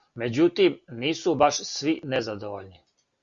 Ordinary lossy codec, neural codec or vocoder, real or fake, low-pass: Opus, 64 kbps; none; real; 7.2 kHz